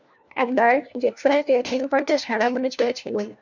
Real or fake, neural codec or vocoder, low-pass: fake; codec, 16 kHz, 1 kbps, FunCodec, trained on LibriTTS, 50 frames a second; 7.2 kHz